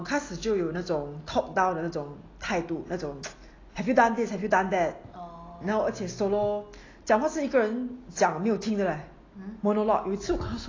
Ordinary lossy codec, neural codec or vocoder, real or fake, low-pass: AAC, 32 kbps; none; real; 7.2 kHz